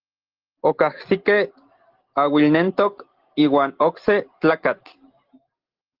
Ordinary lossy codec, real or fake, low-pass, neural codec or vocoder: Opus, 16 kbps; real; 5.4 kHz; none